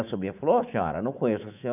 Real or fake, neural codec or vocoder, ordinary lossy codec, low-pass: fake; codec, 24 kHz, 3.1 kbps, DualCodec; none; 3.6 kHz